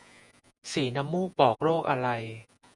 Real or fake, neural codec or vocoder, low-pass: fake; vocoder, 48 kHz, 128 mel bands, Vocos; 10.8 kHz